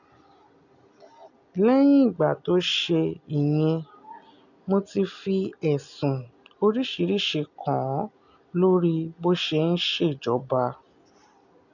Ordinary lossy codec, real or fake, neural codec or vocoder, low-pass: none; real; none; 7.2 kHz